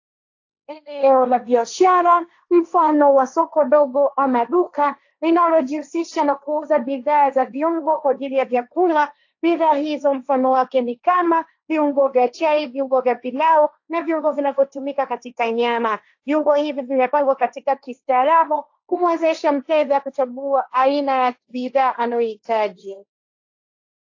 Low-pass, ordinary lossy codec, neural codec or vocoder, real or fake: 7.2 kHz; AAC, 48 kbps; codec, 16 kHz, 1.1 kbps, Voila-Tokenizer; fake